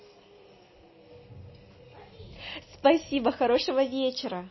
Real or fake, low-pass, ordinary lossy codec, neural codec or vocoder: real; 7.2 kHz; MP3, 24 kbps; none